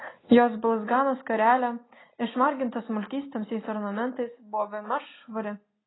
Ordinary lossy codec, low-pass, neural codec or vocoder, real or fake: AAC, 16 kbps; 7.2 kHz; none; real